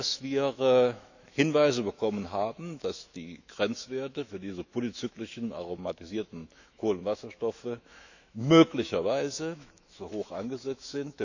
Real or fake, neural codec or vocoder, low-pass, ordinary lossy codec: fake; autoencoder, 48 kHz, 128 numbers a frame, DAC-VAE, trained on Japanese speech; 7.2 kHz; none